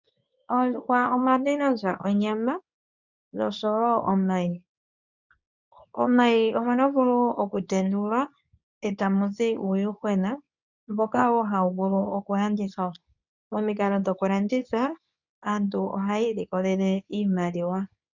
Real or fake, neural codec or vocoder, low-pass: fake; codec, 24 kHz, 0.9 kbps, WavTokenizer, medium speech release version 2; 7.2 kHz